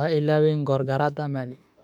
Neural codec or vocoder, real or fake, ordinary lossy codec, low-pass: autoencoder, 48 kHz, 32 numbers a frame, DAC-VAE, trained on Japanese speech; fake; none; 19.8 kHz